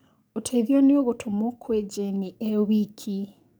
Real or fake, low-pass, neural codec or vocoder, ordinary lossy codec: fake; none; codec, 44.1 kHz, 7.8 kbps, DAC; none